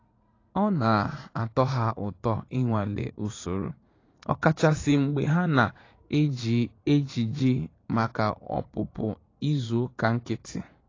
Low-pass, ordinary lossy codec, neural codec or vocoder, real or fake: 7.2 kHz; AAC, 32 kbps; vocoder, 44.1 kHz, 80 mel bands, Vocos; fake